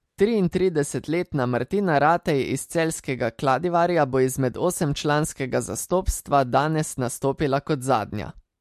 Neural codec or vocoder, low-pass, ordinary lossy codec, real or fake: none; 14.4 kHz; MP3, 64 kbps; real